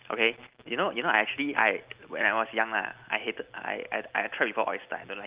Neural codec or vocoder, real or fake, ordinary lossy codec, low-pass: none; real; Opus, 32 kbps; 3.6 kHz